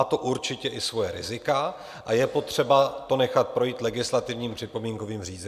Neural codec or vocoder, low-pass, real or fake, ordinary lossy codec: none; 14.4 kHz; real; MP3, 96 kbps